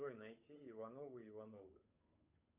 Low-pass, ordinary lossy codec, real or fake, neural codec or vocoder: 3.6 kHz; MP3, 32 kbps; fake; codec, 16 kHz, 8 kbps, FunCodec, trained on Chinese and English, 25 frames a second